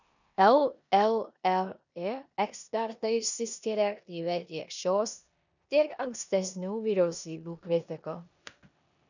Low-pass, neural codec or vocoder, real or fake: 7.2 kHz; codec, 16 kHz in and 24 kHz out, 0.9 kbps, LongCat-Audio-Codec, four codebook decoder; fake